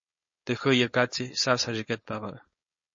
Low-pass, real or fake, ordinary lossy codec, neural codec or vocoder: 7.2 kHz; fake; MP3, 32 kbps; codec, 16 kHz, 4.8 kbps, FACodec